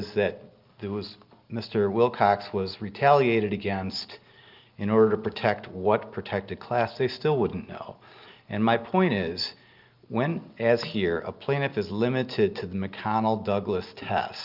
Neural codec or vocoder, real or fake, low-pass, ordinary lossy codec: none; real; 5.4 kHz; Opus, 24 kbps